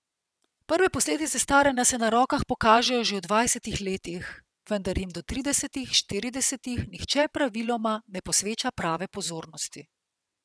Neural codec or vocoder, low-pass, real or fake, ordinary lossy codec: vocoder, 22.05 kHz, 80 mel bands, WaveNeXt; none; fake; none